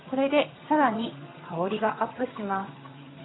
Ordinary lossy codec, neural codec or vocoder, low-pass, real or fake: AAC, 16 kbps; vocoder, 22.05 kHz, 80 mel bands, HiFi-GAN; 7.2 kHz; fake